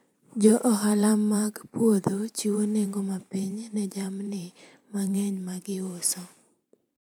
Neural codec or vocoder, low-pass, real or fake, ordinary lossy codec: vocoder, 44.1 kHz, 128 mel bands every 512 samples, BigVGAN v2; none; fake; none